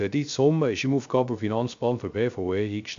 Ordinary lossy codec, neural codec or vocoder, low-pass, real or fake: AAC, 64 kbps; codec, 16 kHz, 0.3 kbps, FocalCodec; 7.2 kHz; fake